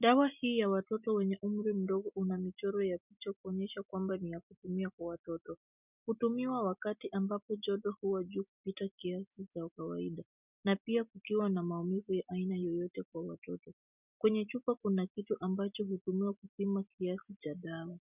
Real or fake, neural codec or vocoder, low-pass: real; none; 3.6 kHz